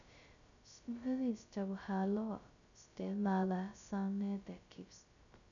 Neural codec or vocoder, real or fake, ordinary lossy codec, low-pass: codec, 16 kHz, 0.2 kbps, FocalCodec; fake; none; 7.2 kHz